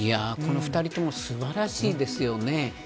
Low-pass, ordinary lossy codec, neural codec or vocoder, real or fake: none; none; none; real